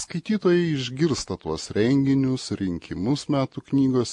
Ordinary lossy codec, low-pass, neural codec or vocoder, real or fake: MP3, 48 kbps; 10.8 kHz; none; real